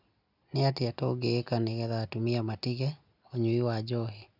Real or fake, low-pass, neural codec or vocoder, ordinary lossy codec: real; 5.4 kHz; none; none